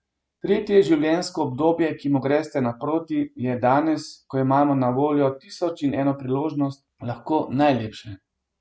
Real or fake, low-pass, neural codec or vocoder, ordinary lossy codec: real; none; none; none